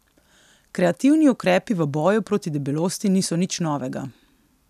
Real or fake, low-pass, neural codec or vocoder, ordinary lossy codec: real; 14.4 kHz; none; none